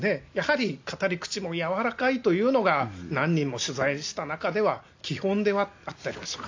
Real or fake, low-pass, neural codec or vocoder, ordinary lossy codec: real; 7.2 kHz; none; AAC, 48 kbps